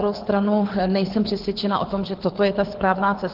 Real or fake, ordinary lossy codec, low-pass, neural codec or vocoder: fake; Opus, 16 kbps; 5.4 kHz; codec, 24 kHz, 6 kbps, HILCodec